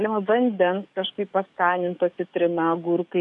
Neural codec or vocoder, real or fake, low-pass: codec, 44.1 kHz, 7.8 kbps, DAC; fake; 10.8 kHz